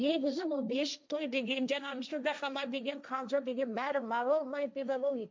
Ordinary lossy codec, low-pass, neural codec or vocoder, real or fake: none; none; codec, 16 kHz, 1.1 kbps, Voila-Tokenizer; fake